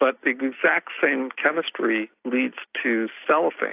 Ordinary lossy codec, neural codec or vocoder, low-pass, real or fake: AAC, 32 kbps; none; 3.6 kHz; real